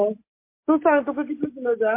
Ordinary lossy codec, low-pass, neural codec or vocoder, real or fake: MP3, 24 kbps; 3.6 kHz; none; real